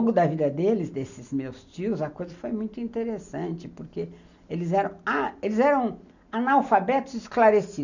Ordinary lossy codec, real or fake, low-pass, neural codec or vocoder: none; real; 7.2 kHz; none